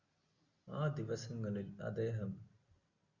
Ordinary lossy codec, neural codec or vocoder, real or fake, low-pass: Opus, 64 kbps; none; real; 7.2 kHz